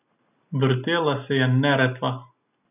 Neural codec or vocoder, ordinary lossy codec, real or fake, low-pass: none; none; real; 3.6 kHz